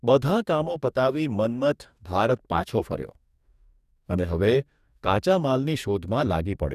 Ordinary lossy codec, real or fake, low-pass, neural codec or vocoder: none; fake; 14.4 kHz; codec, 44.1 kHz, 2.6 kbps, DAC